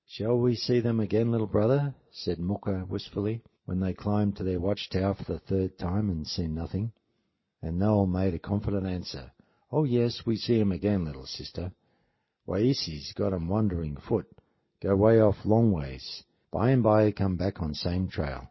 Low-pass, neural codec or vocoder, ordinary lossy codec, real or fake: 7.2 kHz; none; MP3, 24 kbps; real